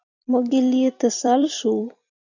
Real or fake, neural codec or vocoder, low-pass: fake; vocoder, 44.1 kHz, 128 mel bands every 256 samples, BigVGAN v2; 7.2 kHz